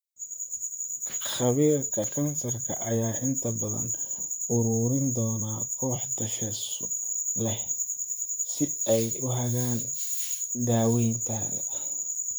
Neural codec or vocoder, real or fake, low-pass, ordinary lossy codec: vocoder, 44.1 kHz, 128 mel bands, Pupu-Vocoder; fake; none; none